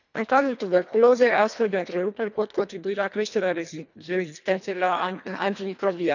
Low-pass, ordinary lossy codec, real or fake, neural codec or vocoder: 7.2 kHz; none; fake; codec, 24 kHz, 1.5 kbps, HILCodec